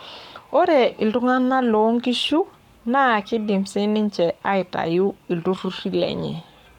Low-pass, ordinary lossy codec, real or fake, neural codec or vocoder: 19.8 kHz; none; fake; codec, 44.1 kHz, 7.8 kbps, Pupu-Codec